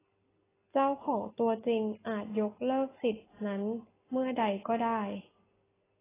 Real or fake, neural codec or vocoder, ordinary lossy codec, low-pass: real; none; AAC, 16 kbps; 3.6 kHz